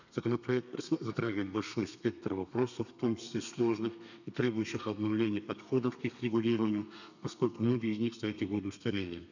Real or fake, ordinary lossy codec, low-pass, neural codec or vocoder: fake; none; 7.2 kHz; codec, 32 kHz, 1.9 kbps, SNAC